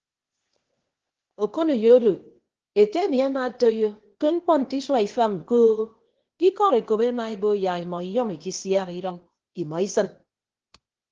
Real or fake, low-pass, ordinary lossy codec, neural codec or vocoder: fake; 7.2 kHz; Opus, 16 kbps; codec, 16 kHz, 0.8 kbps, ZipCodec